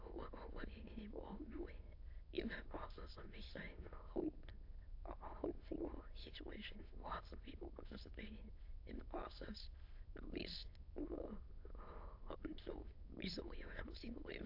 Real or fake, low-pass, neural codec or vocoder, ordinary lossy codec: fake; 5.4 kHz; autoencoder, 22.05 kHz, a latent of 192 numbers a frame, VITS, trained on many speakers; AAC, 48 kbps